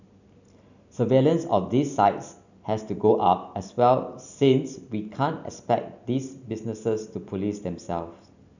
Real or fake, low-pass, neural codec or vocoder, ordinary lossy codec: real; 7.2 kHz; none; none